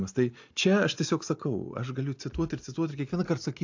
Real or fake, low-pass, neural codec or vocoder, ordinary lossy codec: real; 7.2 kHz; none; AAC, 48 kbps